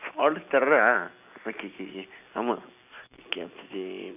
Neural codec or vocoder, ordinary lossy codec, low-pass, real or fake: none; none; 3.6 kHz; real